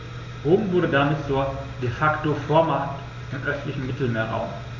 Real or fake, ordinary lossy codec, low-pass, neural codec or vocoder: real; MP3, 64 kbps; 7.2 kHz; none